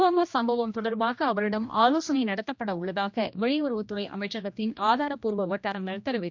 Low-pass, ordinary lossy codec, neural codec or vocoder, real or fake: 7.2 kHz; MP3, 64 kbps; codec, 16 kHz, 1 kbps, X-Codec, HuBERT features, trained on general audio; fake